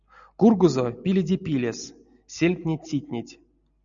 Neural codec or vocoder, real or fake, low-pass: none; real; 7.2 kHz